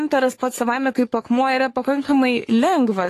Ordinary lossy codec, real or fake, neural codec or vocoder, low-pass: AAC, 48 kbps; fake; codec, 44.1 kHz, 3.4 kbps, Pupu-Codec; 14.4 kHz